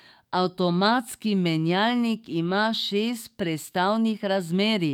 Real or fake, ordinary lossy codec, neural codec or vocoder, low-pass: fake; none; codec, 44.1 kHz, 7.8 kbps, DAC; 19.8 kHz